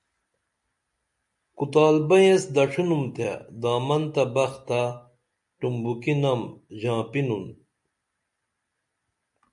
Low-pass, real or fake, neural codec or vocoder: 10.8 kHz; real; none